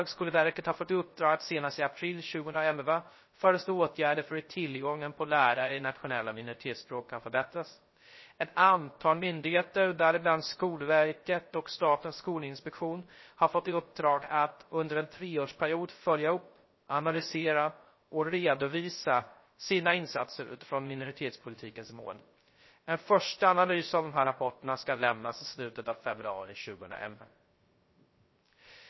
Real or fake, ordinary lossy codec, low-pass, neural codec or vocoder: fake; MP3, 24 kbps; 7.2 kHz; codec, 16 kHz, 0.3 kbps, FocalCodec